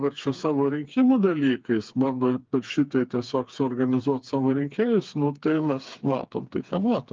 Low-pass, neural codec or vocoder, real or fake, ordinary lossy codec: 7.2 kHz; codec, 16 kHz, 4 kbps, FreqCodec, smaller model; fake; Opus, 24 kbps